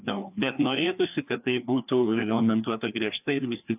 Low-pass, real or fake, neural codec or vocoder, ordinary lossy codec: 3.6 kHz; fake; codec, 16 kHz, 2 kbps, FreqCodec, larger model; AAC, 32 kbps